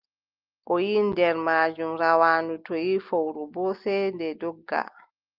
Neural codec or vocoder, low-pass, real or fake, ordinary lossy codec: none; 5.4 kHz; real; Opus, 32 kbps